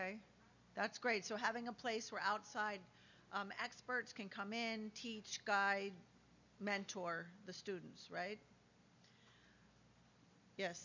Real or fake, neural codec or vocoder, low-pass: real; none; 7.2 kHz